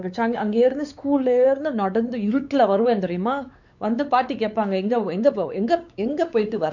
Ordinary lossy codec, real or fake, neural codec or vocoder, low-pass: none; fake; codec, 16 kHz, 4 kbps, X-Codec, WavLM features, trained on Multilingual LibriSpeech; 7.2 kHz